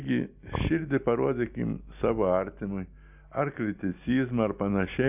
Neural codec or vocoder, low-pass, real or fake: none; 3.6 kHz; real